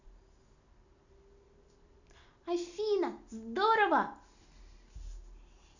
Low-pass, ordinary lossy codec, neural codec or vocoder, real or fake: 7.2 kHz; none; none; real